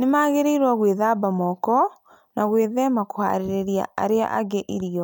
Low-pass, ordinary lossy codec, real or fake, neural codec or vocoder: none; none; real; none